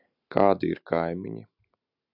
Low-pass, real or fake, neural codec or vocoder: 5.4 kHz; real; none